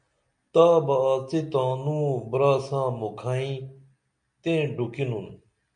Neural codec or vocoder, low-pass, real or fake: none; 9.9 kHz; real